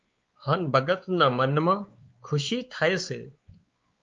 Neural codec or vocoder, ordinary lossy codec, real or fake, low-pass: codec, 16 kHz, 4 kbps, X-Codec, WavLM features, trained on Multilingual LibriSpeech; Opus, 32 kbps; fake; 7.2 kHz